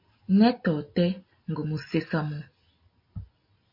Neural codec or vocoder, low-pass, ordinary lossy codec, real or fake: none; 5.4 kHz; MP3, 32 kbps; real